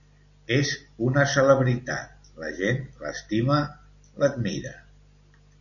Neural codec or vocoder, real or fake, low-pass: none; real; 7.2 kHz